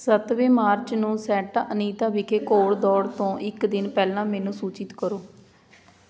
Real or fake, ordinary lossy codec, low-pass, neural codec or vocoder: real; none; none; none